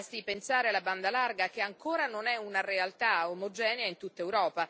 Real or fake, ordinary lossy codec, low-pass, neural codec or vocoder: real; none; none; none